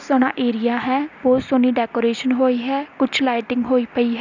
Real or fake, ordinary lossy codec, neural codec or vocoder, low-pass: real; none; none; 7.2 kHz